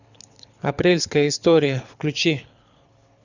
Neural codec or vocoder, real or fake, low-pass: codec, 24 kHz, 6 kbps, HILCodec; fake; 7.2 kHz